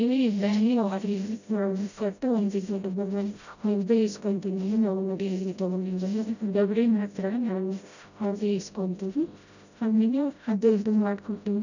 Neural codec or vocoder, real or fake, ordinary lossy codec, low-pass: codec, 16 kHz, 0.5 kbps, FreqCodec, smaller model; fake; none; 7.2 kHz